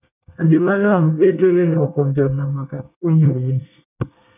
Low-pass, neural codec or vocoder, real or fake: 3.6 kHz; codec, 24 kHz, 1 kbps, SNAC; fake